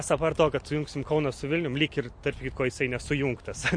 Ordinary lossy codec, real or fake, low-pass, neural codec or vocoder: MP3, 48 kbps; real; 9.9 kHz; none